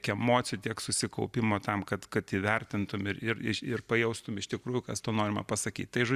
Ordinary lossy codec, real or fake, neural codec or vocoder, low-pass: Opus, 64 kbps; fake; vocoder, 44.1 kHz, 128 mel bands every 256 samples, BigVGAN v2; 14.4 kHz